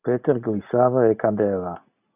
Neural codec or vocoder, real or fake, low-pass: none; real; 3.6 kHz